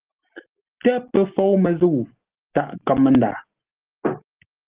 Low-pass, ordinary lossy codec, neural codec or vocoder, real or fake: 3.6 kHz; Opus, 16 kbps; none; real